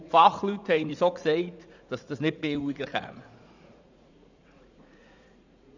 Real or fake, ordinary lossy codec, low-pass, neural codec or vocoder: fake; none; 7.2 kHz; vocoder, 44.1 kHz, 80 mel bands, Vocos